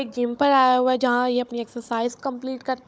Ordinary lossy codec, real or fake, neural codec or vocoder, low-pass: none; fake; codec, 16 kHz, 16 kbps, FunCodec, trained on Chinese and English, 50 frames a second; none